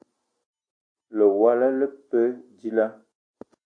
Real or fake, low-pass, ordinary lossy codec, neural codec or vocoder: real; 9.9 kHz; AAC, 64 kbps; none